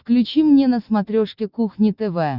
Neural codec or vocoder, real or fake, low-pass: none; real; 5.4 kHz